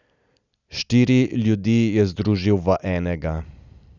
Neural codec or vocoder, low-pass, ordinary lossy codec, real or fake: none; 7.2 kHz; none; real